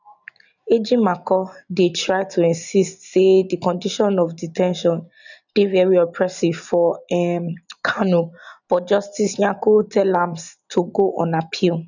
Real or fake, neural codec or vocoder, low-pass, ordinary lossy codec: real; none; 7.2 kHz; none